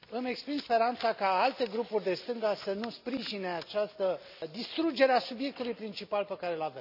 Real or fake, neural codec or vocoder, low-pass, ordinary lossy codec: real; none; 5.4 kHz; none